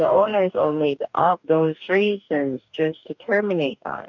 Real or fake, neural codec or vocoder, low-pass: fake; codec, 44.1 kHz, 2.6 kbps, DAC; 7.2 kHz